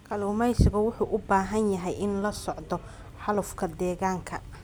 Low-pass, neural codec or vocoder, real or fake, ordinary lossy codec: none; none; real; none